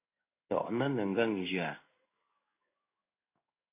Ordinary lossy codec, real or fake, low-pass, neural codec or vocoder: AAC, 24 kbps; fake; 3.6 kHz; codec, 16 kHz in and 24 kHz out, 1 kbps, XY-Tokenizer